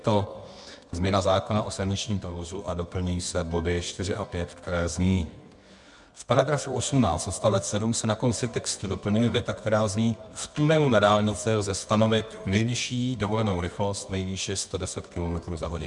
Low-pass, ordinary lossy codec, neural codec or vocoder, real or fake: 10.8 kHz; MP3, 96 kbps; codec, 24 kHz, 0.9 kbps, WavTokenizer, medium music audio release; fake